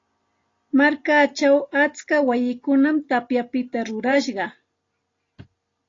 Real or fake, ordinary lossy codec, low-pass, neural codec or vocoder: real; AAC, 32 kbps; 7.2 kHz; none